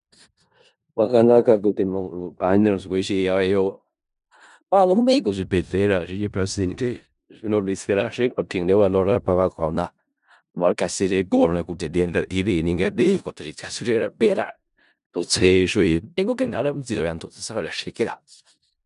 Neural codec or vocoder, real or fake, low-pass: codec, 16 kHz in and 24 kHz out, 0.4 kbps, LongCat-Audio-Codec, four codebook decoder; fake; 10.8 kHz